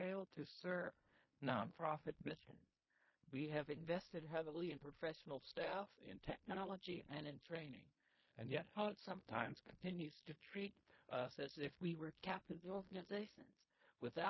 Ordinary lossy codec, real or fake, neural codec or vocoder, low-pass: MP3, 24 kbps; fake; codec, 16 kHz in and 24 kHz out, 0.4 kbps, LongCat-Audio-Codec, fine tuned four codebook decoder; 7.2 kHz